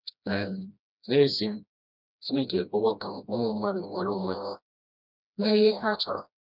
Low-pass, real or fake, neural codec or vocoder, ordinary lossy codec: 5.4 kHz; fake; codec, 16 kHz, 1 kbps, FreqCodec, smaller model; none